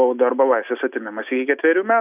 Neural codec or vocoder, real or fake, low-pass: none; real; 3.6 kHz